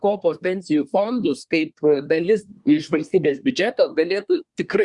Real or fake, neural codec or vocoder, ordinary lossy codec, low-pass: fake; codec, 24 kHz, 1 kbps, SNAC; Opus, 64 kbps; 10.8 kHz